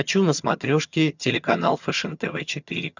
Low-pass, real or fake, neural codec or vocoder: 7.2 kHz; fake; vocoder, 22.05 kHz, 80 mel bands, HiFi-GAN